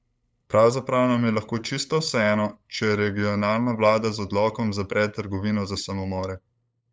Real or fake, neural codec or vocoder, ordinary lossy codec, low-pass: fake; codec, 16 kHz, 8 kbps, FunCodec, trained on LibriTTS, 25 frames a second; none; none